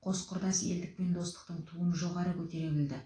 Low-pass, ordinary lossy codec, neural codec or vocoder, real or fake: 9.9 kHz; AAC, 32 kbps; none; real